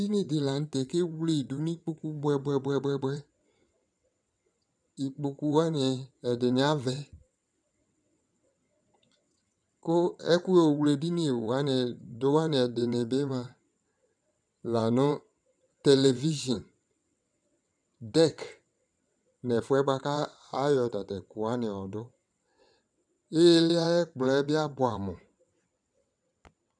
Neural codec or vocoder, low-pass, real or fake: vocoder, 22.05 kHz, 80 mel bands, WaveNeXt; 9.9 kHz; fake